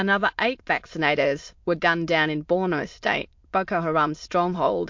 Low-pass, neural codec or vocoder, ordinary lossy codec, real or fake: 7.2 kHz; autoencoder, 22.05 kHz, a latent of 192 numbers a frame, VITS, trained on many speakers; MP3, 48 kbps; fake